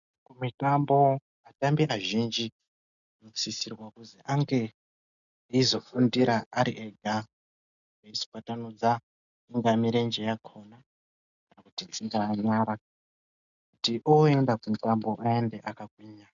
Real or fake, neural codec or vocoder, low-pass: real; none; 7.2 kHz